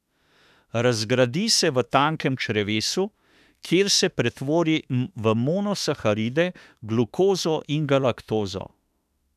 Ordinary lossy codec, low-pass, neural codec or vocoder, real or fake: none; 14.4 kHz; autoencoder, 48 kHz, 32 numbers a frame, DAC-VAE, trained on Japanese speech; fake